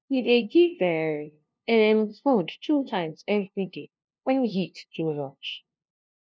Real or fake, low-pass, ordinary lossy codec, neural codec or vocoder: fake; none; none; codec, 16 kHz, 0.5 kbps, FunCodec, trained on LibriTTS, 25 frames a second